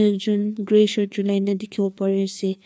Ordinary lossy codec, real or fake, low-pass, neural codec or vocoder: none; fake; none; codec, 16 kHz, 2 kbps, FreqCodec, larger model